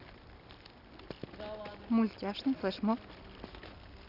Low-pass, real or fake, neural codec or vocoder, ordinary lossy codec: 5.4 kHz; real; none; none